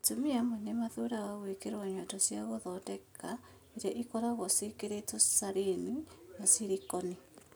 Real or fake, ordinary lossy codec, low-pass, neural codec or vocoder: real; none; none; none